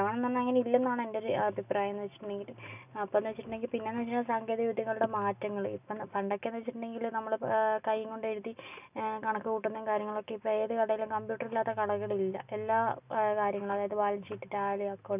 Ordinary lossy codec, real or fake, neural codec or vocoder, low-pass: none; real; none; 3.6 kHz